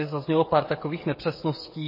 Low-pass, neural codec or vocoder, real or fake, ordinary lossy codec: 5.4 kHz; codec, 16 kHz, 16 kbps, FreqCodec, smaller model; fake; MP3, 24 kbps